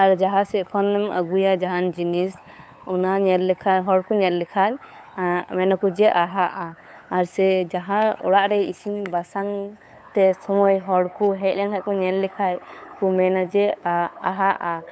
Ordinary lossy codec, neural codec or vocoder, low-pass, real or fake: none; codec, 16 kHz, 8 kbps, FunCodec, trained on LibriTTS, 25 frames a second; none; fake